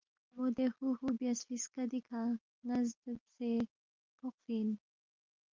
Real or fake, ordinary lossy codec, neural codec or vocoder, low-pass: real; Opus, 32 kbps; none; 7.2 kHz